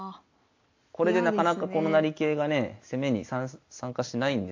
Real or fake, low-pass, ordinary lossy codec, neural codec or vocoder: real; 7.2 kHz; AAC, 48 kbps; none